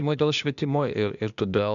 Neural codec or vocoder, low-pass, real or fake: codec, 16 kHz, 0.8 kbps, ZipCodec; 7.2 kHz; fake